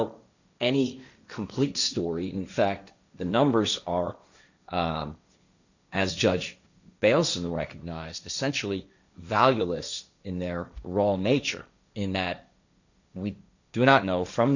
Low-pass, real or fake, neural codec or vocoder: 7.2 kHz; fake; codec, 16 kHz, 1.1 kbps, Voila-Tokenizer